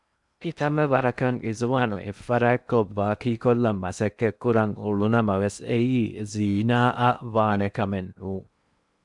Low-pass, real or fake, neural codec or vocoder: 10.8 kHz; fake; codec, 16 kHz in and 24 kHz out, 0.8 kbps, FocalCodec, streaming, 65536 codes